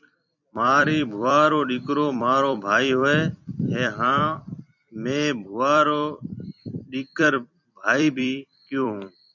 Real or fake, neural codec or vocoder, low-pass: fake; vocoder, 44.1 kHz, 128 mel bands every 256 samples, BigVGAN v2; 7.2 kHz